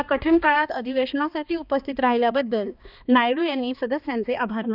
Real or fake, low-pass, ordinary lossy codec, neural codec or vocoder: fake; 5.4 kHz; none; codec, 16 kHz, 2 kbps, X-Codec, HuBERT features, trained on balanced general audio